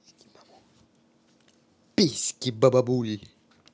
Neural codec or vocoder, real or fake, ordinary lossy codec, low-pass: none; real; none; none